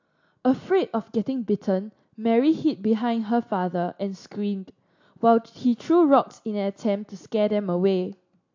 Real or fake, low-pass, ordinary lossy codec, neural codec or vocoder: real; 7.2 kHz; AAC, 48 kbps; none